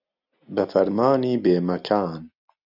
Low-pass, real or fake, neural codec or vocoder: 5.4 kHz; real; none